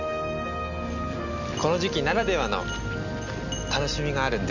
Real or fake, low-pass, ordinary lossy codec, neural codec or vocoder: real; 7.2 kHz; none; none